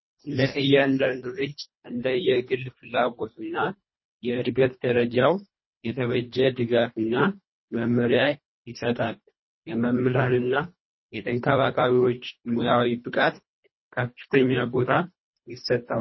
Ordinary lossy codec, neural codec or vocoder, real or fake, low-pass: MP3, 24 kbps; codec, 24 kHz, 1.5 kbps, HILCodec; fake; 7.2 kHz